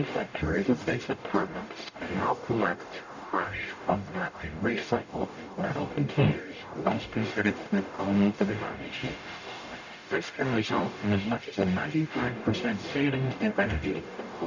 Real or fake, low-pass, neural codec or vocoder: fake; 7.2 kHz; codec, 44.1 kHz, 0.9 kbps, DAC